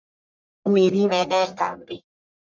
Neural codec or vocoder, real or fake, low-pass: codec, 44.1 kHz, 1.7 kbps, Pupu-Codec; fake; 7.2 kHz